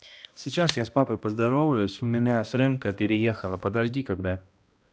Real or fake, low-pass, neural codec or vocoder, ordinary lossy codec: fake; none; codec, 16 kHz, 1 kbps, X-Codec, HuBERT features, trained on balanced general audio; none